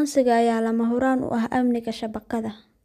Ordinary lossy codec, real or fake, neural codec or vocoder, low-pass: none; real; none; 14.4 kHz